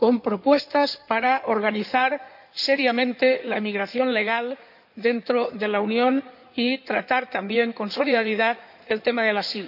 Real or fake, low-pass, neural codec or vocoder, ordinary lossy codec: fake; 5.4 kHz; codec, 16 kHz in and 24 kHz out, 2.2 kbps, FireRedTTS-2 codec; none